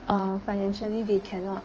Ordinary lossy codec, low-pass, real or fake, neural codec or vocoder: Opus, 24 kbps; 7.2 kHz; fake; codec, 16 kHz in and 24 kHz out, 1.1 kbps, FireRedTTS-2 codec